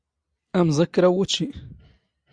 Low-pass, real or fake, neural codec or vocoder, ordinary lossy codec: 9.9 kHz; real; none; Opus, 64 kbps